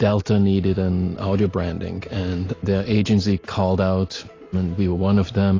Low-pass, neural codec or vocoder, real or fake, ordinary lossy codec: 7.2 kHz; none; real; AAC, 32 kbps